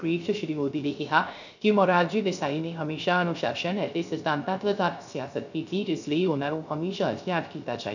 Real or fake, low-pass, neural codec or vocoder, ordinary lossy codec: fake; 7.2 kHz; codec, 16 kHz, 0.3 kbps, FocalCodec; none